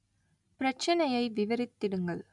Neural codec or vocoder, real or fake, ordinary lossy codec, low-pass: none; real; none; 10.8 kHz